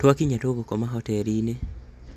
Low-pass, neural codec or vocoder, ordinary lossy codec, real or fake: 14.4 kHz; none; none; real